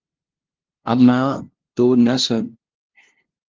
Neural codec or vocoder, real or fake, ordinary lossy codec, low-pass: codec, 16 kHz, 0.5 kbps, FunCodec, trained on LibriTTS, 25 frames a second; fake; Opus, 16 kbps; 7.2 kHz